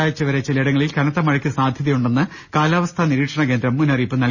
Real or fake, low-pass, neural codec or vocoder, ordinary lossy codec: real; 7.2 kHz; none; none